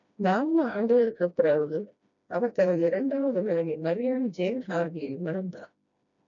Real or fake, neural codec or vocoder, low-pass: fake; codec, 16 kHz, 1 kbps, FreqCodec, smaller model; 7.2 kHz